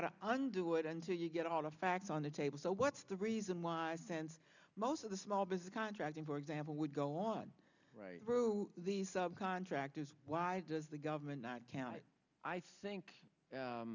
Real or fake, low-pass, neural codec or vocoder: real; 7.2 kHz; none